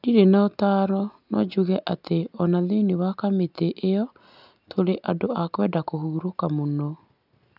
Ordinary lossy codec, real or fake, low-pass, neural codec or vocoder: none; real; 5.4 kHz; none